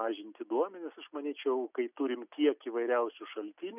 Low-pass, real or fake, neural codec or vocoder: 3.6 kHz; real; none